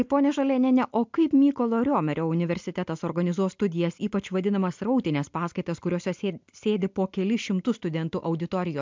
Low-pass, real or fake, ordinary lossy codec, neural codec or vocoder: 7.2 kHz; real; MP3, 64 kbps; none